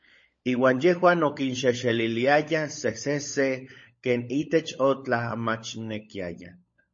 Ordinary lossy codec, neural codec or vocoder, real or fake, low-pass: MP3, 32 kbps; codec, 16 kHz, 16 kbps, FunCodec, trained on LibriTTS, 50 frames a second; fake; 7.2 kHz